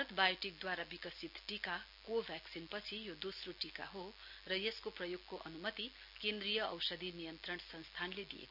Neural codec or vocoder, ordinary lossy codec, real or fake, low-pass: none; none; real; 5.4 kHz